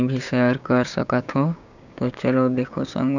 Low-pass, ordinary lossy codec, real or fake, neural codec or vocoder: 7.2 kHz; none; fake; codec, 16 kHz, 6 kbps, DAC